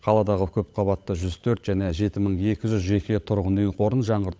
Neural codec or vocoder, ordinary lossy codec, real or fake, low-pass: codec, 16 kHz, 16 kbps, FunCodec, trained on LibriTTS, 50 frames a second; none; fake; none